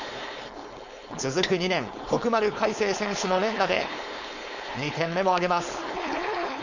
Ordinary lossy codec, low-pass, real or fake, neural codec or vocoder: none; 7.2 kHz; fake; codec, 16 kHz, 4.8 kbps, FACodec